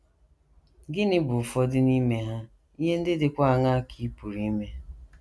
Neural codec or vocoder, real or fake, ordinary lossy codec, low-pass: none; real; none; none